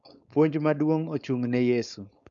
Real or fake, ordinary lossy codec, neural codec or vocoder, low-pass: fake; none; codec, 16 kHz, 4.8 kbps, FACodec; 7.2 kHz